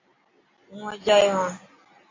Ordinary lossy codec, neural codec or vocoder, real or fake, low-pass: AAC, 32 kbps; none; real; 7.2 kHz